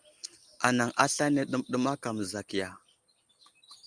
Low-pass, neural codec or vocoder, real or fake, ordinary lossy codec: 9.9 kHz; none; real; Opus, 32 kbps